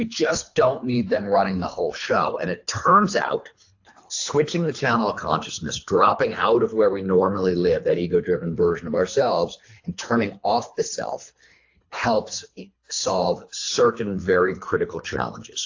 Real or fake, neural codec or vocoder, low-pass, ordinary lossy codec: fake; codec, 24 kHz, 3 kbps, HILCodec; 7.2 kHz; AAC, 48 kbps